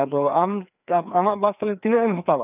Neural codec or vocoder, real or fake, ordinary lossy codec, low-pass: codec, 16 kHz, 2 kbps, FreqCodec, larger model; fake; none; 3.6 kHz